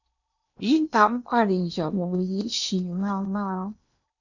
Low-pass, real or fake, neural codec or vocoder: 7.2 kHz; fake; codec, 16 kHz in and 24 kHz out, 0.8 kbps, FocalCodec, streaming, 65536 codes